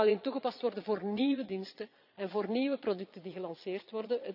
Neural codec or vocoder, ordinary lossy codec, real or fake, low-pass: vocoder, 22.05 kHz, 80 mel bands, Vocos; none; fake; 5.4 kHz